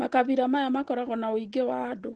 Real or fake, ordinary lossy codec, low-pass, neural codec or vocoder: real; Opus, 16 kbps; 10.8 kHz; none